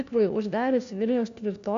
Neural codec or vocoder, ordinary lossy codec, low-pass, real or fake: codec, 16 kHz, 1 kbps, FunCodec, trained on LibriTTS, 50 frames a second; AAC, 64 kbps; 7.2 kHz; fake